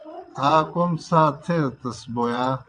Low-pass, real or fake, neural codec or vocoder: 9.9 kHz; fake; vocoder, 22.05 kHz, 80 mel bands, WaveNeXt